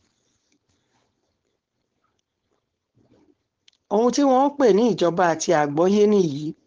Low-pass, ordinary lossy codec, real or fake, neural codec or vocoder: 7.2 kHz; Opus, 16 kbps; fake; codec, 16 kHz, 4.8 kbps, FACodec